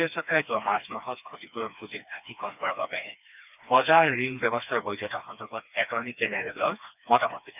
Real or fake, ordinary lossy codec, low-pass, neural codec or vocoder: fake; none; 3.6 kHz; codec, 16 kHz, 2 kbps, FreqCodec, smaller model